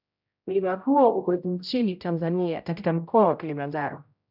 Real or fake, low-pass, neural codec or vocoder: fake; 5.4 kHz; codec, 16 kHz, 0.5 kbps, X-Codec, HuBERT features, trained on general audio